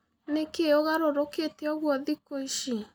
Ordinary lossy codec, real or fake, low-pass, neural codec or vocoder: none; real; none; none